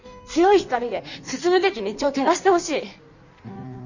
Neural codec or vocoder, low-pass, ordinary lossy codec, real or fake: codec, 16 kHz in and 24 kHz out, 1.1 kbps, FireRedTTS-2 codec; 7.2 kHz; none; fake